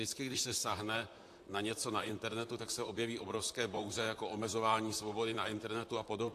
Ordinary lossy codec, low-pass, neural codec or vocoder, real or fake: AAC, 64 kbps; 14.4 kHz; vocoder, 44.1 kHz, 128 mel bands, Pupu-Vocoder; fake